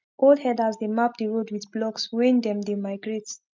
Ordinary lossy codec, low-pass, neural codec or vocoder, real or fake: MP3, 64 kbps; 7.2 kHz; none; real